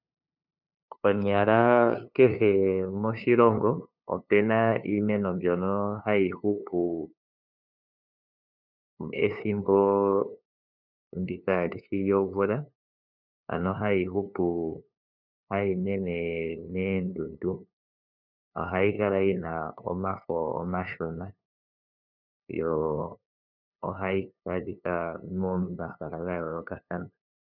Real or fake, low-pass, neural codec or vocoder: fake; 5.4 kHz; codec, 16 kHz, 2 kbps, FunCodec, trained on LibriTTS, 25 frames a second